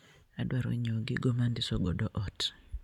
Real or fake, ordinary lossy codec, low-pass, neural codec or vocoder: real; none; 19.8 kHz; none